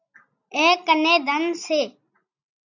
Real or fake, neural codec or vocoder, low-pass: real; none; 7.2 kHz